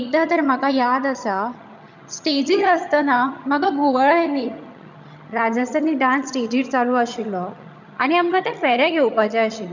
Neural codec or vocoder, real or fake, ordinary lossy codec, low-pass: vocoder, 22.05 kHz, 80 mel bands, HiFi-GAN; fake; none; 7.2 kHz